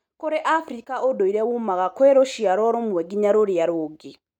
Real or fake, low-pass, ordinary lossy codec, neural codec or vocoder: real; 19.8 kHz; none; none